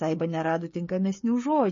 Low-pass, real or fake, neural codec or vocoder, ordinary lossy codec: 7.2 kHz; fake; codec, 16 kHz, 16 kbps, FreqCodec, smaller model; MP3, 32 kbps